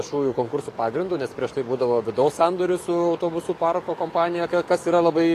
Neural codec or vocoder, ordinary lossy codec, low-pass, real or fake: codec, 44.1 kHz, 7.8 kbps, DAC; AAC, 48 kbps; 14.4 kHz; fake